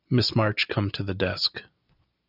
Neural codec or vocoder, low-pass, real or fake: none; 5.4 kHz; real